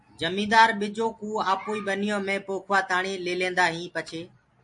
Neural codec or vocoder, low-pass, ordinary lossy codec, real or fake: none; 10.8 kHz; MP3, 48 kbps; real